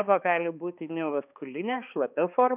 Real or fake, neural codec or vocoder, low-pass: fake; codec, 16 kHz, 2 kbps, X-Codec, HuBERT features, trained on balanced general audio; 3.6 kHz